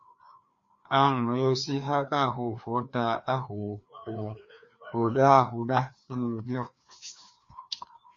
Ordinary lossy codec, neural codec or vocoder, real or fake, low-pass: MP3, 48 kbps; codec, 16 kHz, 2 kbps, FreqCodec, larger model; fake; 7.2 kHz